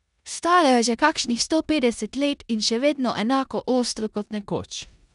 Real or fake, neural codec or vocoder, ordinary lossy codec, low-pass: fake; codec, 16 kHz in and 24 kHz out, 0.9 kbps, LongCat-Audio-Codec, four codebook decoder; none; 10.8 kHz